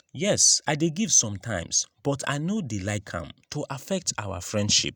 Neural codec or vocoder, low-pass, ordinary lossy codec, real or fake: none; none; none; real